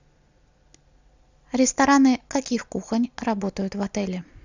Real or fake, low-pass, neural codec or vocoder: real; 7.2 kHz; none